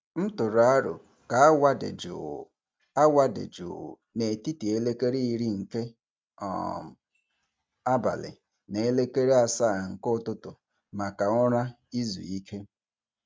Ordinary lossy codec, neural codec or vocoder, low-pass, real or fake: none; none; none; real